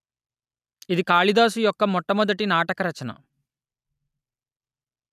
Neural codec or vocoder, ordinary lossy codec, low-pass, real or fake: none; none; 14.4 kHz; real